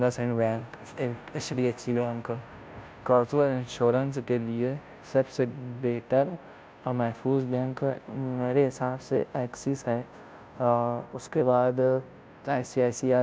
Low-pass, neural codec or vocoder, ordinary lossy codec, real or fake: none; codec, 16 kHz, 0.5 kbps, FunCodec, trained on Chinese and English, 25 frames a second; none; fake